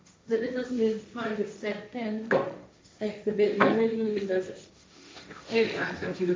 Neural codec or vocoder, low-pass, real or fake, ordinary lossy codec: codec, 16 kHz, 1.1 kbps, Voila-Tokenizer; none; fake; none